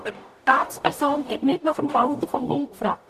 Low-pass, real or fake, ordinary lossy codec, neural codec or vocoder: 14.4 kHz; fake; none; codec, 44.1 kHz, 0.9 kbps, DAC